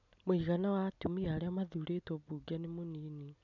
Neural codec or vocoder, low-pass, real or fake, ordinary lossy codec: none; 7.2 kHz; real; none